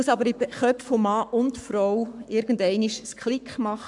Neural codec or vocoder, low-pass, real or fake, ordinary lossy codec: codec, 44.1 kHz, 7.8 kbps, Pupu-Codec; 10.8 kHz; fake; none